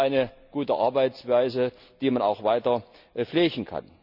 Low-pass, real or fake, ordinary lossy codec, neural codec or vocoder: 5.4 kHz; real; none; none